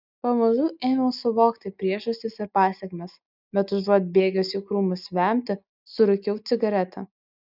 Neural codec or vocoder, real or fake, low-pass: none; real; 5.4 kHz